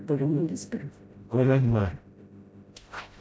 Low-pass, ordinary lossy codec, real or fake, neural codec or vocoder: none; none; fake; codec, 16 kHz, 0.5 kbps, FreqCodec, smaller model